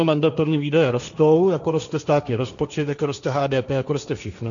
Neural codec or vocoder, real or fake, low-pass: codec, 16 kHz, 1.1 kbps, Voila-Tokenizer; fake; 7.2 kHz